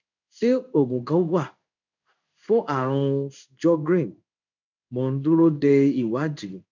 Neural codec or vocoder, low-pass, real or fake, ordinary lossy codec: codec, 16 kHz in and 24 kHz out, 1 kbps, XY-Tokenizer; 7.2 kHz; fake; none